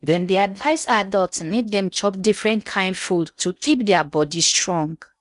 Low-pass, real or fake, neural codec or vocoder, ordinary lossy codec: 10.8 kHz; fake; codec, 16 kHz in and 24 kHz out, 0.6 kbps, FocalCodec, streaming, 4096 codes; none